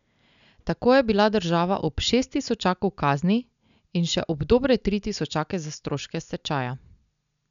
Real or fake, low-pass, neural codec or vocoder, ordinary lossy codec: real; 7.2 kHz; none; none